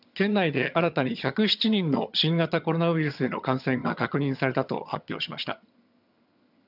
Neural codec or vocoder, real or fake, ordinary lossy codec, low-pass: vocoder, 22.05 kHz, 80 mel bands, HiFi-GAN; fake; none; 5.4 kHz